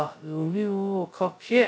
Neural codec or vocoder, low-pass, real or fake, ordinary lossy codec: codec, 16 kHz, 0.2 kbps, FocalCodec; none; fake; none